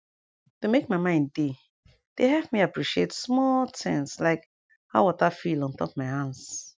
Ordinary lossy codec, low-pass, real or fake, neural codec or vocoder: none; none; real; none